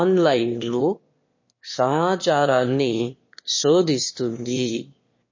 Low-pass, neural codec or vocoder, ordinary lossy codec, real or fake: 7.2 kHz; autoencoder, 22.05 kHz, a latent of 192 numbers a frame, VITS, trained on one speaker; MP3, 32 kbps; fake